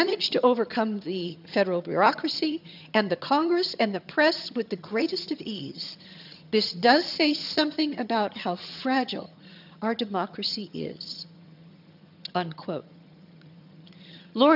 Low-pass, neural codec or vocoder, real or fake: 5.4 kHz; vocoder, 22.05 kHz, 80 mel bands, HiFi-GAN; fake